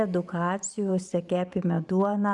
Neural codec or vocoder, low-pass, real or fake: vocoder, 44.1 kHz, 128 mel bands every 512 samples, BigVGAN v2; 10.8 kHz; fake